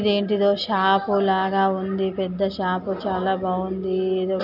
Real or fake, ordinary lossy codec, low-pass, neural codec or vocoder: real; none; 5.4 kHz; none